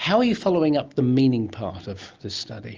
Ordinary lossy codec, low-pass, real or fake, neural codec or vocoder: Opus, 24 kbps; 7.2 kHz; real; none